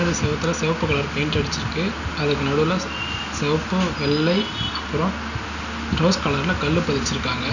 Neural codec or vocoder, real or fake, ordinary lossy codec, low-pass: none; real; none; 7.2 kHz